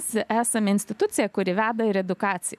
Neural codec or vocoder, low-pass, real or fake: none; 14.4 kHz; real